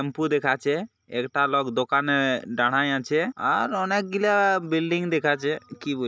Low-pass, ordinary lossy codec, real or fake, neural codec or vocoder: none; none; real; none